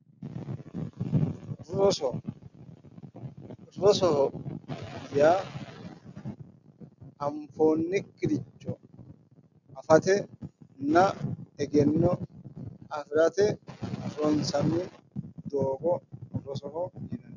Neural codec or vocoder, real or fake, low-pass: none; real; 7.2 kHz